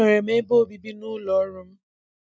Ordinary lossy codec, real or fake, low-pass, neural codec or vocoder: none; real; none; none